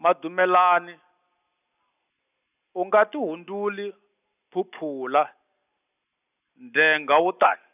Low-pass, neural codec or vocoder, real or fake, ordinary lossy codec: 3.6 kHz; none; real; none